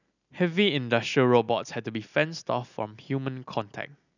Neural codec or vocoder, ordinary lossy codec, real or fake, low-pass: none; none; real; 7.2 kHz